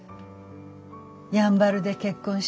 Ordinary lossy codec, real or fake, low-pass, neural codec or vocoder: none; real; none; none